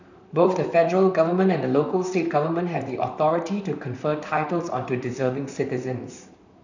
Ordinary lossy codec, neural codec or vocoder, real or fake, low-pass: none; vocoder, 44.1 kHz, 128 mel bands, Pupu-Vocoder; fake; 7.2 kHz